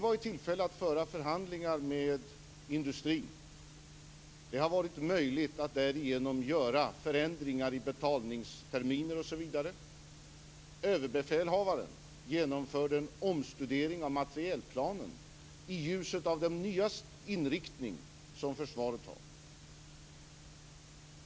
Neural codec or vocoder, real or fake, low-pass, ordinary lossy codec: none; real; none; none